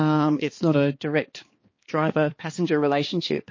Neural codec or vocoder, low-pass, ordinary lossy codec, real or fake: codec, 16 kHz, 2 kbps, X-Codec, HuBERT features, trained on balanced general audio; 7.2 kHz; MP3, 32 kbps; fake